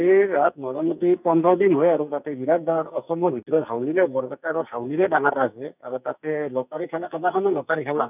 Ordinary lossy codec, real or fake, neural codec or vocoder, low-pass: none; fake; codec, 44.1 kHz, 2.6 kbps, SNAC; 3.6 kHz